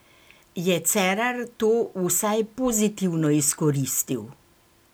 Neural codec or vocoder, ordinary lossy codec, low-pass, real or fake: none; none; none; real